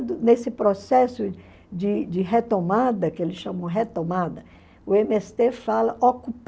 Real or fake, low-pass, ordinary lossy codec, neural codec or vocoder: real; none; none; none